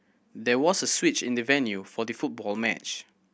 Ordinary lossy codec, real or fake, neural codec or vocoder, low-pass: none; real; none; none